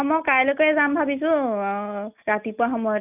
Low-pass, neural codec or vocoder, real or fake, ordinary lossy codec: 3.6 kHz; none; real; none